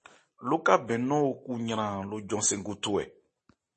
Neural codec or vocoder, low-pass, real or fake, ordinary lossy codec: none; 9.9 kHz; real; MP3, 32 kbps